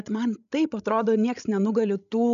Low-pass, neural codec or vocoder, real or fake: 7.2 kHz; codec, 16 kHz, 16 kbps, FreqCodec, larger model; fake